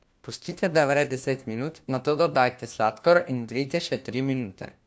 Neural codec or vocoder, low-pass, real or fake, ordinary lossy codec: codec, 16 kHz, 1 kbps, FunCodec, trained on LibriTTS, 50 frames a second; none; fake; none